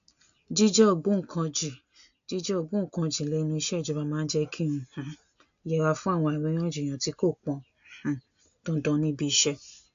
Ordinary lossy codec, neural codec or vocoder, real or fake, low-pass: AAC, 64 kbps; none; real; 7.2 kHz